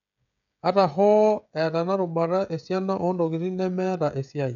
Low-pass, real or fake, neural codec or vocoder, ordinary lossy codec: 7.2 kHz; fake; codec, 16 kHz, 16 kbps, FreqCodec, smaller model; none